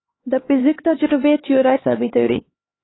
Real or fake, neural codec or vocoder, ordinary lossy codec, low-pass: fake; codec, 16 kHz, 2 kbps, X-Codec, HuBERT features, trained on LibriSpeech; AAC, 16 kbps; 7.2 kHz